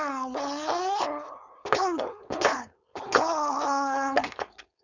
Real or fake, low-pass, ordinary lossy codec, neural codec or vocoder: fake; 7.2 kHz; none; codec, 16 kHz, 4.8 kbps, FACodec